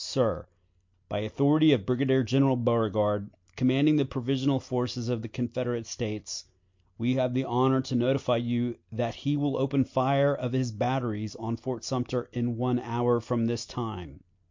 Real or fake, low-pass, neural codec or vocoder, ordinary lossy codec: real; 7.2 kHz; none; MP3, 48 kbps